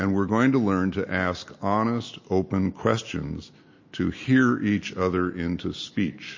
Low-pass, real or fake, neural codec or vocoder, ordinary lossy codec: 7.2 kHz; real; none; MP3, 32 kbps